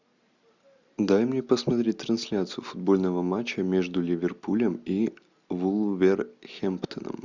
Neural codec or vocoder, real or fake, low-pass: none; real; 7.2 kHz